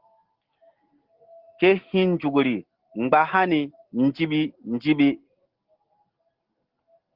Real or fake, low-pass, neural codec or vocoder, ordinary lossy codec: real; 5.4 kHz; none; Opus, 16 kbps